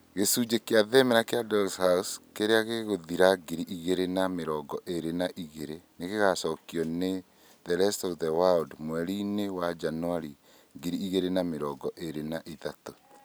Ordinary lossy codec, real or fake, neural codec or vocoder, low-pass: none; real; none; none